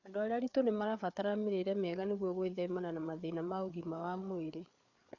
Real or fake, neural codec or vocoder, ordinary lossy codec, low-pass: fake; codec, 16 kHz, 4 kbps, FreqCodec, larger model; none; 7.2 kHz